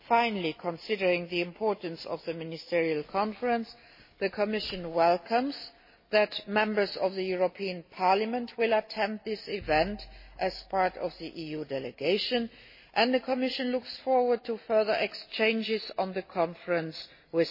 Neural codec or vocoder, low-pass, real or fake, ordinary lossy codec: none; 5.4 kHz; real; MP3, 24 kbps